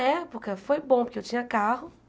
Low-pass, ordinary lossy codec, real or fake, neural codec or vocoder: none; none; real; none